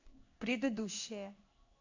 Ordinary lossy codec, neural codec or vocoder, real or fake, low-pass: AAC, 48 kbps; codec, 16 kHz in and 24 kHz out, 1 kbps, XY-Tokenizer; fake; 7.2 kHz